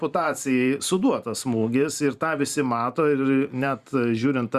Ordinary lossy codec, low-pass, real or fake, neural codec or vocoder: Opus, 64 kbps; 14.4 kHz; fake; vocoder, 44.1 kHz, 128 mel bands every 512 samples, BigVGAN v2